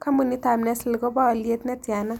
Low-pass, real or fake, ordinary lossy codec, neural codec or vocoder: 19.8 kHz; real; none; none